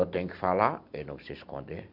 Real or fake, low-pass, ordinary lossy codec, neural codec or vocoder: real; 5.4 kHz; MP3, 48 kbps; none